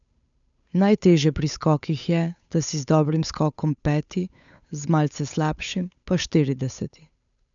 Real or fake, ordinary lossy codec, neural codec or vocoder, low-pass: fake; none; codec, 16 kHz, 8 kbps, FunCodec, trained on Chinese and English, 25 frames a second; 7.2 kHz